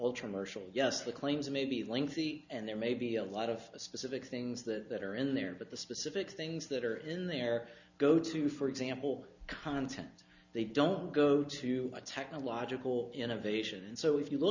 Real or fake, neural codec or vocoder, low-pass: real; none; 7.2 kHz